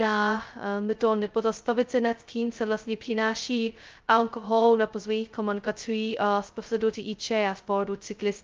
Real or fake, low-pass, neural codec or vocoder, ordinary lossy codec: fake; 7.2 kHz; codec, 16 kHz, 0.2 kbps, FocalCodec; Opus, 32 kbps